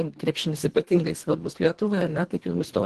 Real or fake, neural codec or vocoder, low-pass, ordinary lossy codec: fake; codec, 24 kHz, 1.5 kbps, HILCodec; 10.8 kHz; Opus, 16 kbps